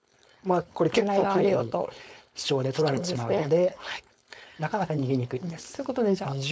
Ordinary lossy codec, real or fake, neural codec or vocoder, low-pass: none; fake; codec, 16 kHz, 4.8 kbps, FACodec; none